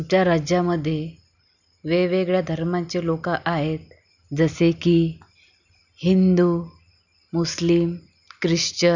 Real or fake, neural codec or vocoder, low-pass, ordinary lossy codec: real; none; 7.2 kHz; none